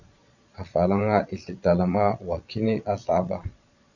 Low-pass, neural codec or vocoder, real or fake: 7.2 kHz; vocoder, 22.05 kHz, 80 mel bands, Vocos; fake